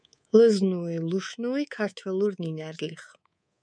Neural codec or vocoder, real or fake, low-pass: codec, 24 kHz, 3.1 kbps, DualCodec; fake; 9.9 kHz